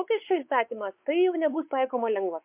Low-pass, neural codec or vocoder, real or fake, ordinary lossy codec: 3.6 kHz; codec, 16 kHz, 4 kbps, X-Codec, WavLM features, trained on Multilingual LibriSpeech; fake; MP3, 32 kbps